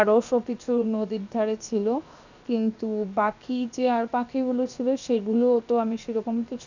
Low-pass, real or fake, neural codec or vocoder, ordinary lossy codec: 7.2 kHz; fake; codec, 16 kHz, 0.7 kbps, FocalCodec; none